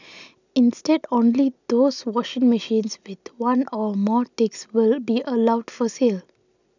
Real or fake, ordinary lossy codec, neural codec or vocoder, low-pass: real; none; none; 7.2 kHz